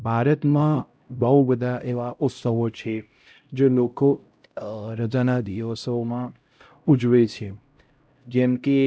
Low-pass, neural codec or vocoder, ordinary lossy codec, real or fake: none; codec, 16 kHz, 0.5 kbps, X-Codec, HuBERT features, trained on LibriSpeech; none; fake